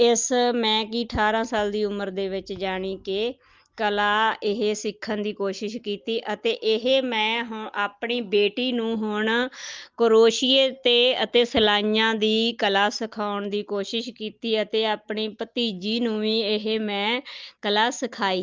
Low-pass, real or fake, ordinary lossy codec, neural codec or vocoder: 7.2 kHz; real; Opus, 32 kbps; none